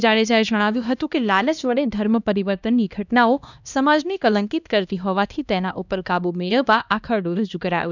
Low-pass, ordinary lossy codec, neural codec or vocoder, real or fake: 7.2 kHz; none; codec, 16 kHz, 1 kbps, X-Codec, HuBERT features, trained on LibriSpeech; fake